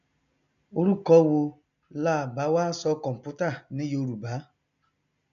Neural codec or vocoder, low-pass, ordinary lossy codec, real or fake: none; 7.2 kHz; none; real